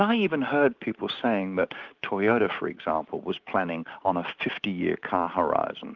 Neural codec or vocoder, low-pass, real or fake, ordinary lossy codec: none; 7.2 kHz; real; Opus, 32 kbps